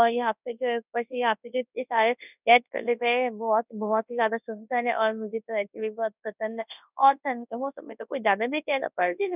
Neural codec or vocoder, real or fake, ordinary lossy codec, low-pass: codec, 24 kHz, 0.9 kbps, WavTokenizer, large speech release; fake; none; 3.6 kHz